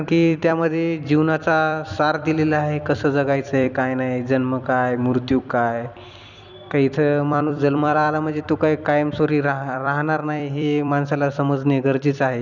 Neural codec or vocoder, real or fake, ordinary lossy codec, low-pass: vocoder, 44.1 kHz, 128 mel bands every 256 samples, BigVGAN v2; fake; none; 7.2 kHz